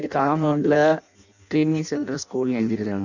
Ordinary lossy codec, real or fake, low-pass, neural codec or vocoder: none; fake; 7.2 kHz; codec, 16 kHz in and 24 kHz out, 0.6 kbps, FireRedTTS-2 codec